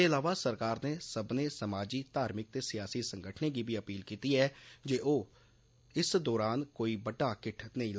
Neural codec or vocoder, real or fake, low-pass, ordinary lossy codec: none; real; none; none